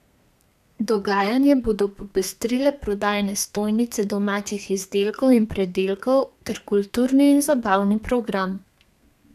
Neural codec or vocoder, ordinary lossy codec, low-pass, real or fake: codec, 32 kHz, 1.9 kbps, SNAC; none; 14.4 kHz; fake